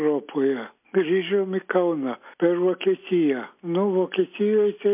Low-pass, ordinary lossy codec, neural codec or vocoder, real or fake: 3.6 kHz; MP3, 32 kbps; none; real